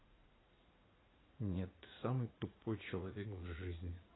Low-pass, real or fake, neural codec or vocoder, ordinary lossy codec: 7.2 kHz; fake; vocoder, 44.1 kHz, 80 mel bands, Vocos; AAC, 16 kbps